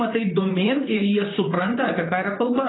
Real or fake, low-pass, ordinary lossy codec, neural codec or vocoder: fake; 7.2 kHz; AAC, 16 kbps; vocoder, 44.1 kHz, 128 mel bands, Pupu-Vocoder